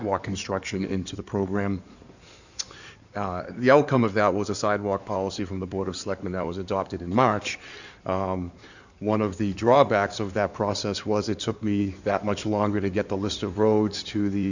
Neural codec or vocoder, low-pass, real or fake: codec, 16 kHz in and 24 kHz out, 2.2 kbps, FireRedTTS-2 codec; 7.2 kHz; fake